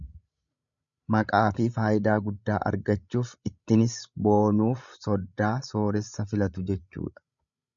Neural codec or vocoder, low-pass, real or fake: codec, 16 kHz, 16 kbps, FreqCodec, larger model; 7.2 kHz; fake